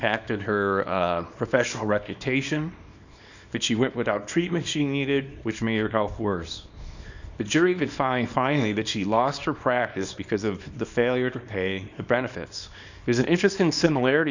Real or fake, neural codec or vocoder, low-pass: fake; codec, 24 kHz, 0.9 kbps, WavTokenizer, small release; 7.2 kHz